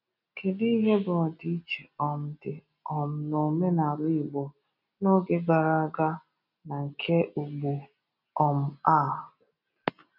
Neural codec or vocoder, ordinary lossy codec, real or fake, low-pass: none; none; real; 5.4 kHz